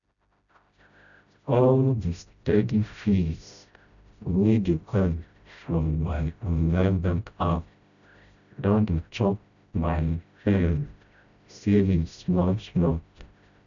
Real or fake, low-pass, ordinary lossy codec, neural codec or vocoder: fake; 7.2 kHz; none; codec, 16 kHz, 0.5 kbps, FreqCodec, smaller model